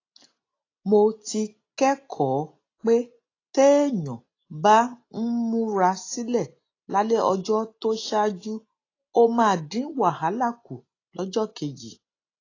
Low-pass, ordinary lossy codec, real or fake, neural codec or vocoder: 7.2 kHz; AAC, 32 kbps; real; none